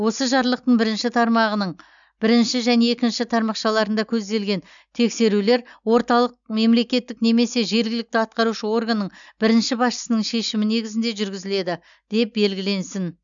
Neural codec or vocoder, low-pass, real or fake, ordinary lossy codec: none; 7.2 kHz; real; MP3, 96 kbps